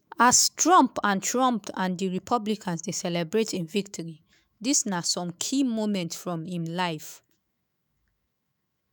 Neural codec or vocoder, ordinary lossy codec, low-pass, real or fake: autoencoder, 48 kHz, 128 numbers a frame, DAC-VAE, trained on Japanese speech; none; none; fake